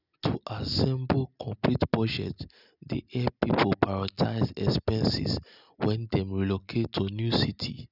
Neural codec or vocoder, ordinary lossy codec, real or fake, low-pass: none; none; real; 5.4 kHz